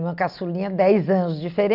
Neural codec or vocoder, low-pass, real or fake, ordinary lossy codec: none; 5.4 kHz; real; none